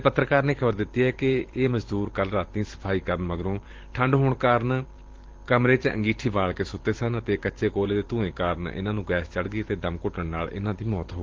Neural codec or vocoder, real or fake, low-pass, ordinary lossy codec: vocoder, 44.1 kHz, 128 mel bands every 512 samples, BigVGAN v2; fake; 7.2 kHz; Opus, 16 kbps